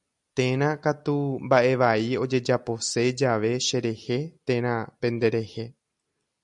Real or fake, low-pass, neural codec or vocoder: real; 10.8 kHz; none